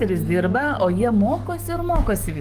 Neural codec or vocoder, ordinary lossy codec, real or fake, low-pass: codec, 44.1 kHz, 7.8 kbps, DAC; Opus, 32 kbps; fake; 14.4 kHz